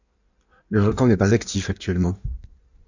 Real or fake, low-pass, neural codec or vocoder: fake; 7.2 kHz; codec, 16 kHz in and 24 kHz out, 1.1 kbps, FireRedTTS-2 codec